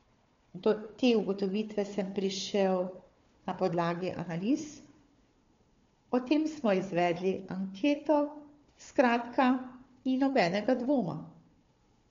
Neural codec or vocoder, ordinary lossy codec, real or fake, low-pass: codec, 16 kHz, 4 kbps, FunCodec, trained on Chinese and English, 50 frames a second; MP3, 48 kbps; fake; 7.2 kHz